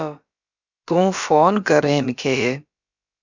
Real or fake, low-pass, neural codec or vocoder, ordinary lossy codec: fake; 7.2 kHz; codec, 16 kHz, about 1 kbps, DyCAST, with the encoder's durations; Opus, 64 kbps